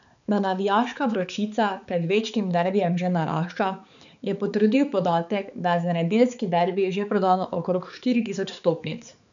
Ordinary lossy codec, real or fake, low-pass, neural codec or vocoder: none; fake; 7.2 kHz; codec, 16 kHz, 4 kbps, X-Codec, HuBERT features, trained on balanced general audio